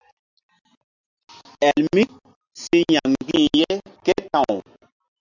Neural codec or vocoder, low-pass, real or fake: none; 7.2 kHz; real